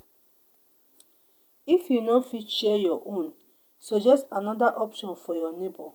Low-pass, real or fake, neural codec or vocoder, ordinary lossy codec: none; fake; vocoder, 48 kHz, 128 mel bands, Vocos; none